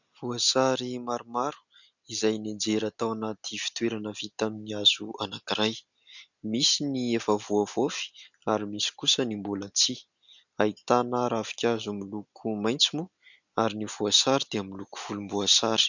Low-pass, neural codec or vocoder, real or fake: 7.2 kHz; none; real